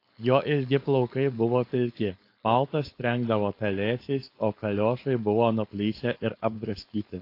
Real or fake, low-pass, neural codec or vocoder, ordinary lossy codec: fake; 5.4 kHz; codec, 16 kHz, 4.8 kbps, FACodec; AAC, 32 kbps